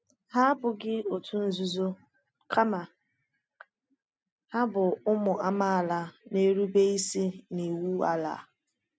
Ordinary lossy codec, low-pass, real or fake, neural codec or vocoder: none; none; real; none